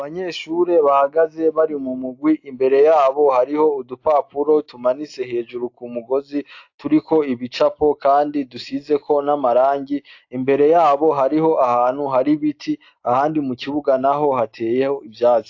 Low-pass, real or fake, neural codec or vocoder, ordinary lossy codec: 7.2 kHz; real; none; AAC, 48 kbps